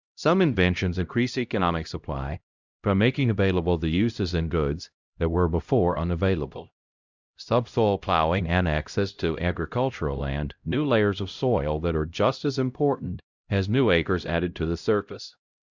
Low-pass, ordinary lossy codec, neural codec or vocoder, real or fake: 7.2 kHz; Opus, 64 kbps; codec, 16 kHz, 0.5 kbps, X-Codec, HuBERT features, trained on LibriSpeech; fake